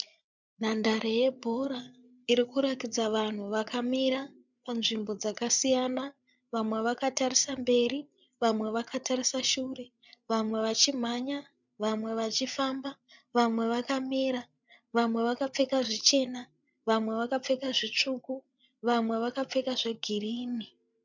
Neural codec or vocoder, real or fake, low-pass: codec, 16 kHz, 8 kbps, FreqCodec, larger model; fake; 7.2 kHz